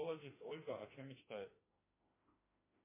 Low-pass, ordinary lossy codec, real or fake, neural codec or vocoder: 3.6 kHz; MP3, 16 kbps; fake; autoencoder, 48 kHz, 32 numbers a frame, DAC-VAE, trained on Japanese speech